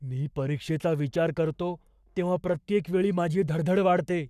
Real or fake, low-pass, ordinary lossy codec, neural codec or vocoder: fake; 14.4 kHz; none; codec, 44.1 kHz, 7.8 kbps, Pupu-Codec